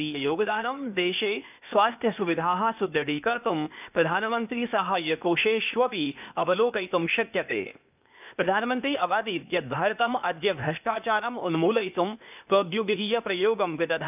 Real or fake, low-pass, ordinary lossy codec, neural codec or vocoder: fake; 3.6 kHz; none; codec, 16 kHz, 0.8 kbps, ZipCodec